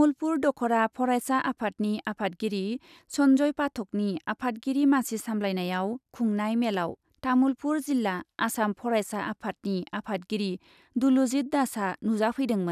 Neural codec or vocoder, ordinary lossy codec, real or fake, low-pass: none; none; real; 14.4 kHz